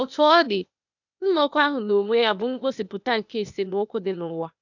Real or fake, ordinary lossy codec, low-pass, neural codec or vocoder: fake; none; 7.2 kHz; codec, 16 kHz, 0.8 kbps, ZipCodec